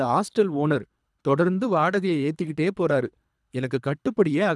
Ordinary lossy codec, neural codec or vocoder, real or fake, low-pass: none; codec, 24 kHz, 3 kbps, HILCodec; fake; none